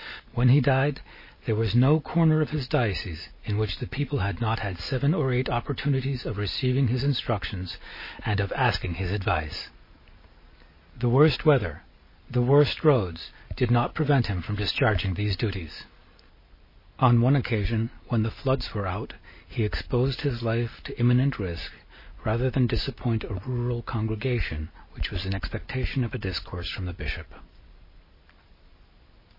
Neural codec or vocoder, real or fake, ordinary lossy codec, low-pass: none; real; MP3, 24 kbps; 5.4 kHz